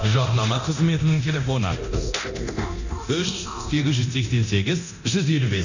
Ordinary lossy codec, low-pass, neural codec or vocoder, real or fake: none; 7.2 kHz; codec, 24 kHz, 0.9 kbps, DualCodec; fake